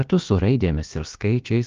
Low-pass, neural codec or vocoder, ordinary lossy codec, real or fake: 7.2 kHz; codec, 16 kHz, about 1 kbps, DyCAST, with the encoder's durations; Opus, 32 kbps; fake